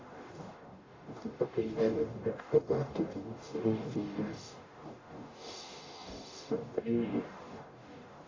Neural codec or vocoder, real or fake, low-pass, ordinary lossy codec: codec, 44.1 kHz, 0.9 kbps, DAC; fake; 7.2 kHz; AAC, 32 kbps